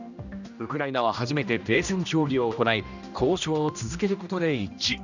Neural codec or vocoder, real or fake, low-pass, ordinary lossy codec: codec, 16 kHz, 1 kbps, X-Codec, HuBERT features, trained on general audio; fake; 7.2 kHz; none